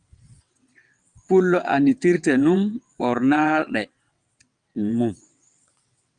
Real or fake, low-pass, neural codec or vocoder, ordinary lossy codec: fake; 9.9 kHz; vocoder, 22.05 kHz, 80 mel bands, WaveNeXt; Opus, 32 kbps